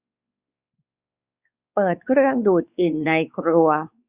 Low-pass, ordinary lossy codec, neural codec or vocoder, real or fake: 3.6 kHz; Opus, 64 kbps; codec, 16 kHz, 4 kbps, X-Codec, WavLM features, trained on Multilingual LibriSpeech; fake